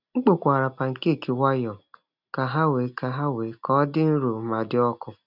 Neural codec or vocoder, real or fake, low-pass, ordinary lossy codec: none; real; 5.4 kHz; none